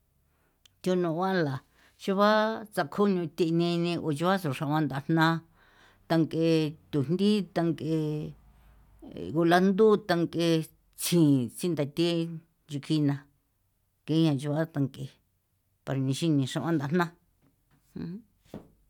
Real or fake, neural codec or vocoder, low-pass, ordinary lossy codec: real; none; 19.8 kHz; none